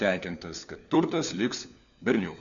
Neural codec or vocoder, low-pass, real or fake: codec, 16 kHz, 4 kbps, FunCodec, trained on LibriTTS, 50 frames a second; 7.2 kHz; fake